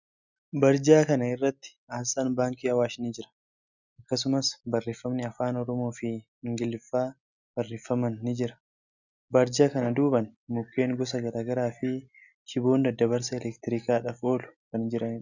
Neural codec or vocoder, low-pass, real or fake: none; 7.2 kHz; real